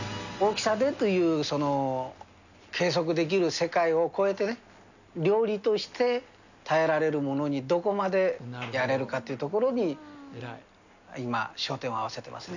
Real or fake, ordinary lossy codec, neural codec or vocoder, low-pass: real; none; none; 7.2 kHz